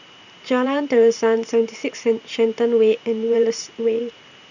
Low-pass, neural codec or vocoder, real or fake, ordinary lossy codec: 7.2 kHz; vocoder, 44.1 kHz, 128 mel bands every 512 samples, BigVGAN v2; fake; none